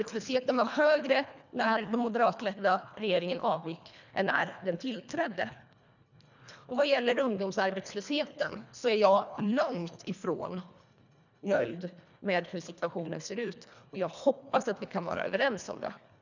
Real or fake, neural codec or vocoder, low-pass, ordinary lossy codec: fake; codec, 24 kHz, 1.5 kbps, HILCodec; 7.2 kHz; none